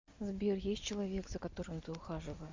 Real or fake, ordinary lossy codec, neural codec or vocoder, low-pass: real; MP3, 48 kbps; none; 7.2 kHz